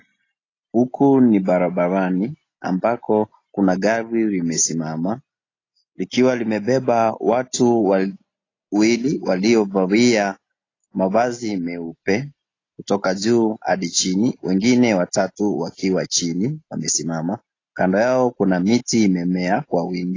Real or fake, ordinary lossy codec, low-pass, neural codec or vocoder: real; AAC, 32 kbps; 7.2 kHz; none